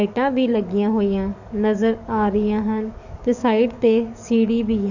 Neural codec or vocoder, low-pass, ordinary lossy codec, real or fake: codec, 44.1 kHz, 7.8 kbps, DAC; 7.2 kHz; none; fake